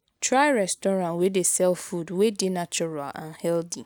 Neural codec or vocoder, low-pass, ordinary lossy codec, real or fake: none; none; none; real